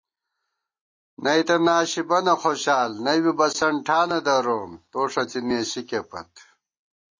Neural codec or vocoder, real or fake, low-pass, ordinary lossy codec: none; real; 7.2 kHz; MP3, 32 kbps